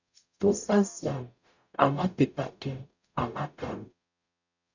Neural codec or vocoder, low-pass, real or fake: codec, 44.1 kHz, 0.9 kbps, DAC; 7.2 kHz; fake